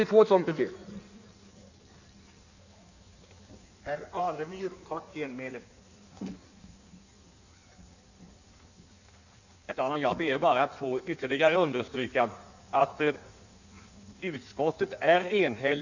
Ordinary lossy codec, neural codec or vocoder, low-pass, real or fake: none; codec, 16 kHz in and 24 kHz out, 1.1 kbps, FireRedTTS-2 codec; 7.2 kHz; fake